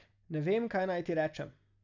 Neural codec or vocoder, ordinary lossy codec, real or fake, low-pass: none; none; real; 7.2 kHz